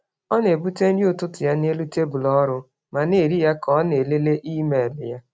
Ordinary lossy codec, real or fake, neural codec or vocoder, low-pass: none; real; none; none